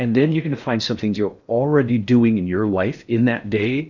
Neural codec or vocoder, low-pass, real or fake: codec, 16 kHz in and 24 kHz out, 0.6 kbps, FocalCodec, streaming, 4096 codes; 7.2 kHz; fake